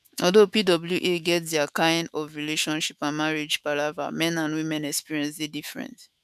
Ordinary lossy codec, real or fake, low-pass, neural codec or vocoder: none; fake; 14.4 kHz; autoencoder, 48 kHz, 128 numbers a frame, DAC-VAE, trained on Japanese speech